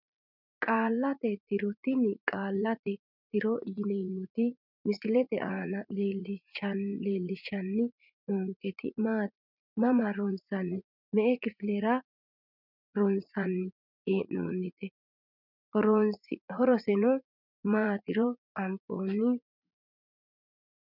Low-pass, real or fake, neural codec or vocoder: 5.4 kHz; fake; vocoder, 44.1 kHz, 80 mel bands, Vocos